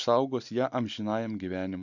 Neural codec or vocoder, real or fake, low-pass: none; real; 7.2 kHz